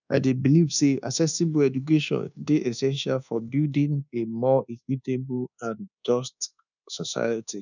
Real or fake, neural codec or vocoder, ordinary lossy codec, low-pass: fake; codec, 24 kHz, 1.2 kbps, DualCodec; none; 7.2 kHz